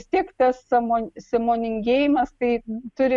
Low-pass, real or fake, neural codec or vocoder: 10.8 kHz; real; none